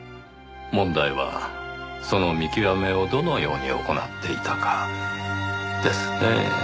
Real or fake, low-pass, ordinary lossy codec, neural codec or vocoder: real; none; none; none